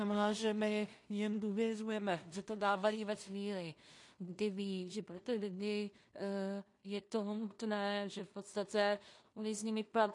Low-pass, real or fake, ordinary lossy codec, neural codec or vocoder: 10.8 kHz; fake; MP3, 48 kbps; codec, 16 kHz in and 24 kHz out, 0.4 kbps, LongCat-Audio-Codec, two codebook decoder